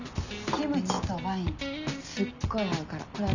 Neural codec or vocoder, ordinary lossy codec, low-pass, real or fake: none; none; 7.2 kHz; real